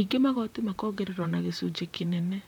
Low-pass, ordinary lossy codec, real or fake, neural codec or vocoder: 19.8 kHz; none; fake; vocoder, 44.1 kHz, 128 mel bands, Pupu-Vocoder